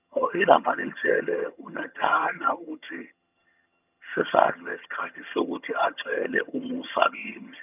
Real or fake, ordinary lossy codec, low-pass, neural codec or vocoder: fake; none; 3.6 kHz; vocoder, 22.05 kHz, 80 mel bands, HiFi-GAN